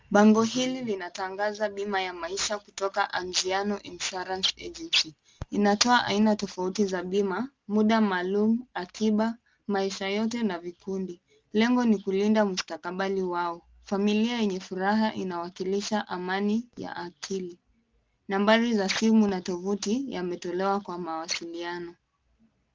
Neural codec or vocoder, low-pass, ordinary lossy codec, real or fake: none; 7.2 kHz; Opus, 32 kbps; real